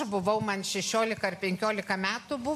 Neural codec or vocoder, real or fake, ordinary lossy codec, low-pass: none; real; MP3, 64 kbps; 14.4 kHz